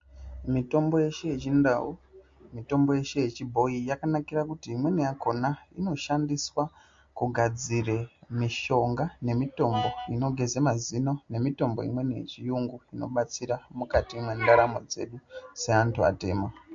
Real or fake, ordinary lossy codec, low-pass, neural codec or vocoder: real; MP3, 48 kbps; 7.2 kHz; none